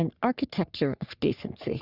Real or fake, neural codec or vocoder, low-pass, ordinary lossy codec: fake; codec, 44.1 kHz, 3.4 kbps, Pupu-Codec; 5.4 kHz; Opus, 64 kbps